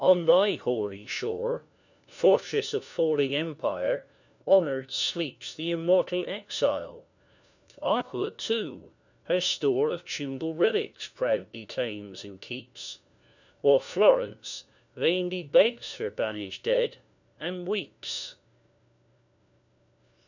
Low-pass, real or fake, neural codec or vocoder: 7.2 kHz; fake; codec, 16 kHz, 1 kbps, FunCodec, trained on LibriTTS, 50 frames a second